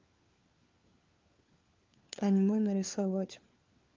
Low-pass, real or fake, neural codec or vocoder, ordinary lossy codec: 7.2 kHz; fake; codec, 16 kHz, 4 kbps, FunCodec, trained on LibriTTS, 50 frames a second; Opus, 24 kbps